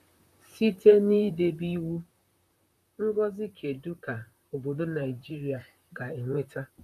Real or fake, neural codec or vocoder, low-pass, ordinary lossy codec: fake; vocoder, 44.1 kHz, 128 mel bands, Pupu-Vocoder; 14.4 kHz; none